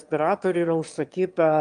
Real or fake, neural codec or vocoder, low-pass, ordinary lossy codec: fake; autoencoder, 22.05 kHz, a latent of 192 numbers a frame, VITS, trained on one speaker; 9.9 kHz; Opus, 32 kbps